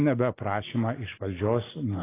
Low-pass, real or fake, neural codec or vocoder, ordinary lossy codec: 3.6 kHz; real; none; AAC, 16 kbps